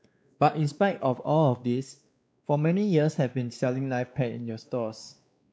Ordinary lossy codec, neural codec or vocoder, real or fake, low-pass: none; codec, 16 kHz, 2 kbps, X-Codec, WavLM features, trained on Multilingual LibriSpeech; fake; none